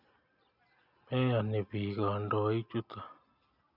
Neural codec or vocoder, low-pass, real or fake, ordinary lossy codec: none; 5.4 kHz; real; none